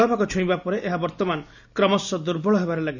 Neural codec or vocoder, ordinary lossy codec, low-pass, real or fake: none; none; 7.2 kHz; real